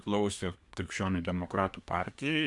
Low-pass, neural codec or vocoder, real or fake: 10.8 kHz; codec, 24 kHz, 1 kbps, SNAC; fake